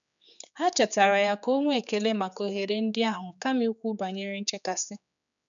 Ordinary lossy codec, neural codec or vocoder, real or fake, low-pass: none; codec, 16 kHz, 4 kbps, X-Codec, HuBERT features, trained on general audio; fake; 7.2 kHz